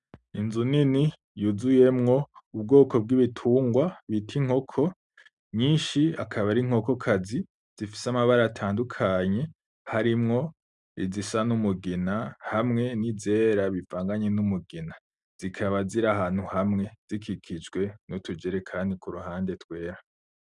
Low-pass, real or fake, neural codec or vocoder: 10.8 kHz; real; none